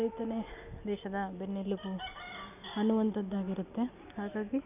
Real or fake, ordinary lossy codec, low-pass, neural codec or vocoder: real; none; 3.6 kHz; none